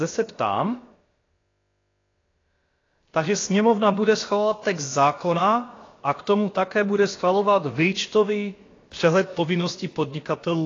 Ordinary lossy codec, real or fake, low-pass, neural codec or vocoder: AAC, 32 kbps; fake; 7.2 kHz; codec, 16 kHz, about 1 kbps, DyCAST, with the encoder's durations